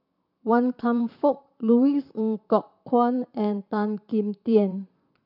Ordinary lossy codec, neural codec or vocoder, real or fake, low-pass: none; vocoder, 44.1 kHz, 128 mel bands, Pupu-Vocoder; fake; 5.4 kHz